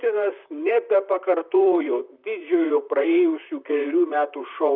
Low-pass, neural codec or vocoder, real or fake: 5.4 kHz; vocoder, 44.1 kHz, 128 mel bands, Pupu-Vocoder; fake